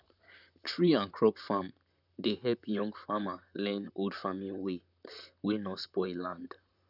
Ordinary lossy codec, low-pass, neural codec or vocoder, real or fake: none; 5.4 kHz; vocoder, 44.1 kHz, 128 mel bands, Pupu-Vocoder; fake